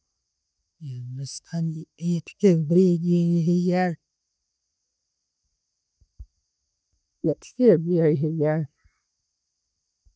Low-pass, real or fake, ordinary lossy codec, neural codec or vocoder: none; real; none; none